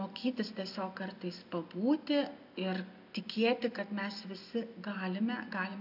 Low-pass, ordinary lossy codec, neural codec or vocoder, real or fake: 5.4 kHz; AAC, 48 kbps; none; real